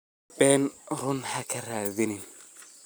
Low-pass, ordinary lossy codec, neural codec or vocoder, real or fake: none; none; none; real